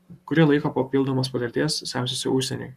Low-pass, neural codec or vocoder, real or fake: 14.4 kHz; codec, 44.1 kHz, 7.8 kbps, Pupu-Codec; fake